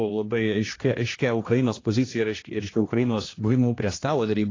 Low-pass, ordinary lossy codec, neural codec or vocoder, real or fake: 7.2 kHz; AAC, 32 kbps; codec, 16 kHz, 1 kbps, X-Codec, HuBERT features, trained on general audio; fake